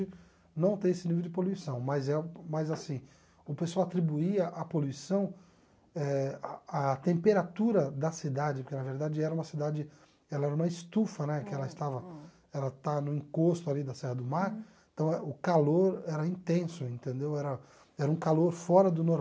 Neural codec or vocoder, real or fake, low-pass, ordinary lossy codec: none; real; none; none